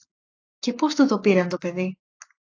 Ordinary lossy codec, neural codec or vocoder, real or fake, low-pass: AAC, 48 kbps; codec, 44.1 kHz, 7.8 kbps, Pupu-Codec; fake; 7.2 kHz